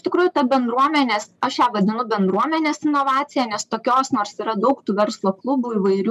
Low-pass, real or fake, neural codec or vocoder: 14.4 kHz; real; none